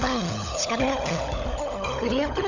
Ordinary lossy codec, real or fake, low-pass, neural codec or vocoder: none; fake; 7.2 kHz; codec, 16 kHz, 16 kbps, FunCodec, trained on LibriTTS, 50 frames a second